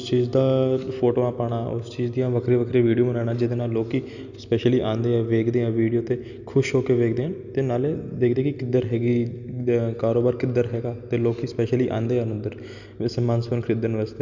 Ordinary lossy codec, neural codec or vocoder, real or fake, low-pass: none; none; real; 7.2 kHz